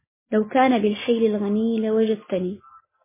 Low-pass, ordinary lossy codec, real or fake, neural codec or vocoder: 3.6 kHz; MP3, 16 kbps; real; none